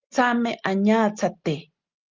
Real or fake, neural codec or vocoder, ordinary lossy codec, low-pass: real; none; Opus, 24 kbps; 7.2 kHz